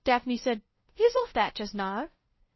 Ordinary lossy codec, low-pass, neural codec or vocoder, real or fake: MP3, 24 kbps; 7.2 kHz; codec, 16 kHz, 0.5 kbps, FunCodec, trained on LibriTTS, 25 frames a second; fake